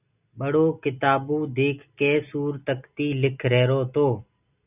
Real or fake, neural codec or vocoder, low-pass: real; none; 3.6 kHz